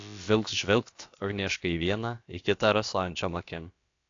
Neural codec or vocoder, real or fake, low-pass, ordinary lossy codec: codec, 16 kHz, about 1 kbps, DyCAST, with the encoder's durations; fake; 7.2 kHz; AAC, 48 kbps